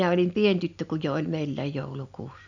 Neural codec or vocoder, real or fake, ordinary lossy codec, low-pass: none; real; none; 7.2 kHz